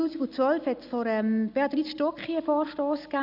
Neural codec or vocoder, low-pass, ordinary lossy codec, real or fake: none; 5.4 kHz; none; real